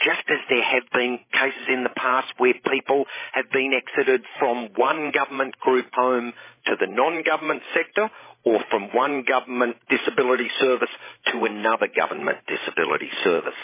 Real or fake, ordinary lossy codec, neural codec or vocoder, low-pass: fake; MP3, 16 kbps; codec, 16 kHz, 16 kbps, FreqCodec, larger model; 3.6 kHz